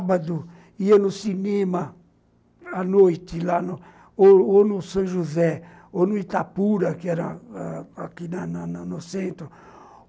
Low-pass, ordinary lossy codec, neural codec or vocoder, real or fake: none; none; none; real